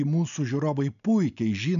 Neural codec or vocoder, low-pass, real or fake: none; 7.2 kHz; real